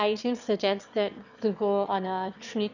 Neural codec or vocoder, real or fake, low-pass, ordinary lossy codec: autoencoder, 22.05 kHz, a latent of 192 numbers a frame, VITS, trained on one speaker; fake; 7.2 kHz; none